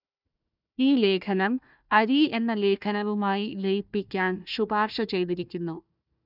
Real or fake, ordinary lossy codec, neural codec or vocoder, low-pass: fake; none; codec, 16 kHz, 1 kbps, FunCodec, trained on Chinese and English, 50 frames a second; 5.4 kHz